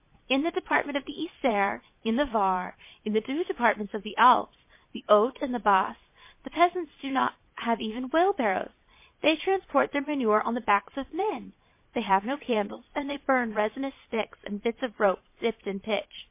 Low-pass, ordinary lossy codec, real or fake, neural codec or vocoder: 3.6 kHz; MP3, 24 kbps; fake; vocoder, 22.05 kHz, 80 mel bands, Vocos